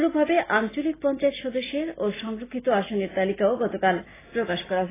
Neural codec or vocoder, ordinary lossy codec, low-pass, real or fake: none; AAC, 16 kbps; 3.6 kHz; real